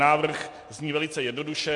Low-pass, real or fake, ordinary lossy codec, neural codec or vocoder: 10.8 kHz; real; MP3, 48 kbps; none